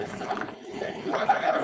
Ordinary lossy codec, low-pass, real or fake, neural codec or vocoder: none; none; fake; codec, 16 kHz, 4.8 kbps, FACodec